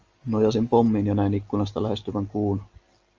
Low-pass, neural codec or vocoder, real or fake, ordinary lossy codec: 7.2 kHz; none; real; Opus, 24 kbps